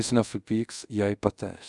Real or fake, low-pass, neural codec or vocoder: fake; 10.8 kHz; codec, 24 kHz, 0.5 kbps, DualCodec